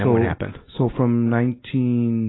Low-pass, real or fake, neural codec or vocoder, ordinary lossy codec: 7.2 kHz; real; none; AAC, 16 kbps